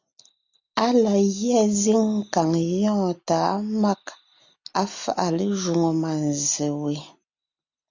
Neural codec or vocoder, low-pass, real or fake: none; 7.2 kHz; real